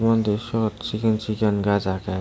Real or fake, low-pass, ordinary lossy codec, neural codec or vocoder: real; none; none; none